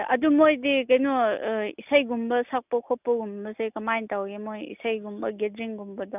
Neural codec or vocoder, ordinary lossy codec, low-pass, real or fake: none; none; 3.6 kHz; real